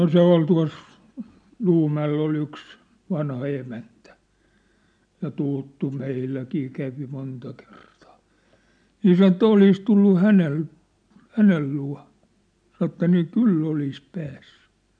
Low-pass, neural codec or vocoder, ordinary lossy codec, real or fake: 9.9 kHz; none; none; real